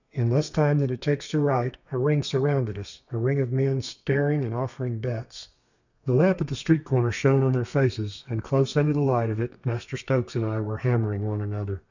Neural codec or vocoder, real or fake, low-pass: codec, 32 kHz, 1.9 kbps, SNAC; fake; 7.2 kHz